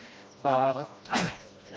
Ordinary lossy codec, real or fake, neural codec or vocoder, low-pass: none; fake; codec, 16 kHz, 1 kbps, FreqCodec, smaller model; none